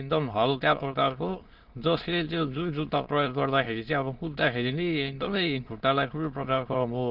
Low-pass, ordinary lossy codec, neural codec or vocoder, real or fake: 5.4 kHz; Opus, 16 kbps; autoencoder, 22.05 kHz, a latent of 192 numbers a frame, VITS, trained on many speakers; fake